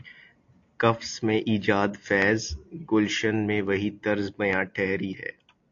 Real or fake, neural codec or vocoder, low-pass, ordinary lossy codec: real; none; 7.2 kHz; AAC, 48 kbps